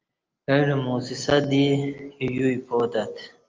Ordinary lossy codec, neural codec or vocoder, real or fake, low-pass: Opus, 24 kbps; none; real; 7.2 kHz